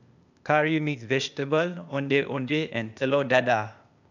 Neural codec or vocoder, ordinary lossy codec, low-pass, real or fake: codec, 16 kHz, 0.8 kbps, ZipCodec; none; 7.2 kHz; fake